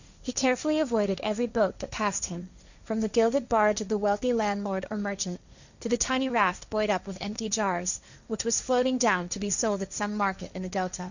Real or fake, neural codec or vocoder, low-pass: fake; codec, 16 kHz, 1.1 kbps, Voila-Tokenizer; 7.2 kHz